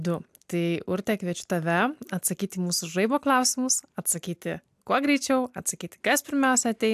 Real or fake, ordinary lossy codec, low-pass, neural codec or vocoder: real; AAC, 96 kbps; 14.4 kHz; none